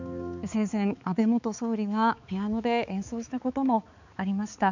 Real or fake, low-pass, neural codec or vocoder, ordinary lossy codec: fake; 7.2 kHz; codec, 16 kHz, 4 kbps, X-Codec, HuBERT features, trained on balanced general audio; none